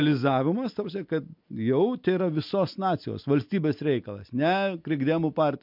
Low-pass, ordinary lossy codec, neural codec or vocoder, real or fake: 5.4 kHz; MP3, 48 kbps; none; real